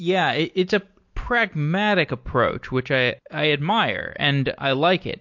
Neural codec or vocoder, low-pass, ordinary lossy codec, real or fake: none; 7.2 kHz; MP3, 48 kbps; real